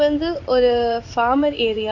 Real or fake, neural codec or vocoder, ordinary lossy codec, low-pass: fake; codec, 16 kHz in and 24 kHz out, 1 kbps, XY-Tokenizer; none; 7.2 kHz